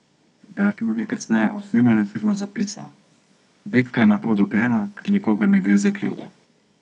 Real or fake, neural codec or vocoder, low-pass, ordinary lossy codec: fake; codec, 24 kHz, 0.9 kbps, WavTokenizer, medium music audio release; 10.8 kHz; none